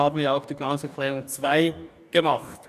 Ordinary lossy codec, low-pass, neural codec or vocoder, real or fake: none; 14.4 kHz; codec, 44.1 kHz, 2.6 kbps, DAC; fake